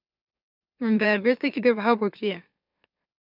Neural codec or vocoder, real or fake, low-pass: autoencoder, 44.1 kHz, a latent of 192 numbers a frame, MeloTTS; fake; 5.4 kHz